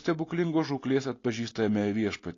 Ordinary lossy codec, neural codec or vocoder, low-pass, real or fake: AAC, 32 kbps; none; 7.2 kHz; real